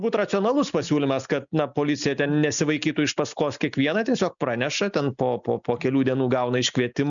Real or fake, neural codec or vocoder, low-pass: real; none; 7.2 kHz